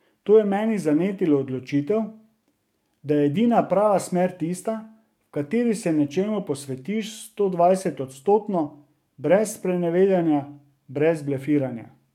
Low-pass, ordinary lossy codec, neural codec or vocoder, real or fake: 19.8 kHz; MP3, 96 kbps; codec, 44.1 kHz, 7.8 kbps, DAC; fake